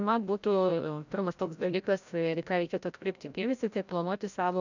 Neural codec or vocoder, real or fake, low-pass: codec, 16 kHz, 0.5 kbps, FreqCodec, larger model; fake; 7.2 kHz